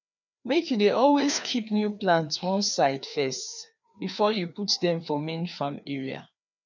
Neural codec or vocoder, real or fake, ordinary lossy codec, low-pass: codec, 16 kHz, 2 kbps, FreqCodec, larger model; fake; none; 7.2 kHz